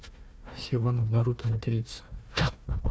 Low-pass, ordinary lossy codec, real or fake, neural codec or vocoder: none; none; fake; codec, 16 kHz, 1 kbps, FunCodec, trained on Chinese and English, 50 frames a second